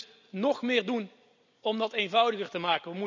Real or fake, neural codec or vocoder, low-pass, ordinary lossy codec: real; none; 7.2 kHz; none